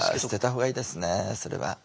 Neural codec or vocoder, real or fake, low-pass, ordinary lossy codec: none; real; none; none